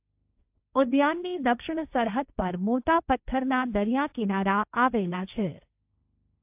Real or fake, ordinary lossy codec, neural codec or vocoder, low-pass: fake; AAC, 32 kbps; codec, 16 kHz, 1.1 kbps, Voila-Tokenizer; 3.6 kHz